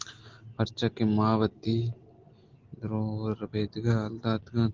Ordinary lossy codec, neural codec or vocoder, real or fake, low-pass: Opus, 16 kbps; none; real; 7.2 kHz